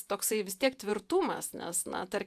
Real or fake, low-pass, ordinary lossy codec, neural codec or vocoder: fake; 14.4 kHz; AAC, 96 kbps; vocoder, 48 kHz, 128 mel bands, Vocos